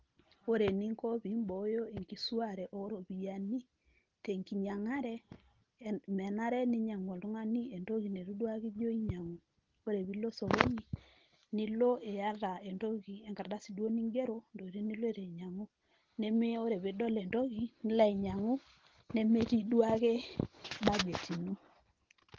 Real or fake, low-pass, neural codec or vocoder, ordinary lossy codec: real; 7.2 kHz; none; Opus, 16 kbps